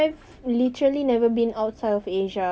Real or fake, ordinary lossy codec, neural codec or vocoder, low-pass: real; none; none; none